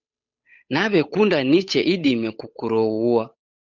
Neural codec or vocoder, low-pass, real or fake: codec, 16 kHz, 8 kbps, FunCodec, trained on Chinese and English, 25 frames a second; 7.2 kHz; fake